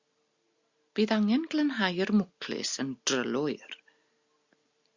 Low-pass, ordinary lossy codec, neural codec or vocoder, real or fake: 7.2 kHz; Opus, 64 kbps; none; real